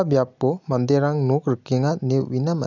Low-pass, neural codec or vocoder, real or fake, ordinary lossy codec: 7.2 kHz; none; real; none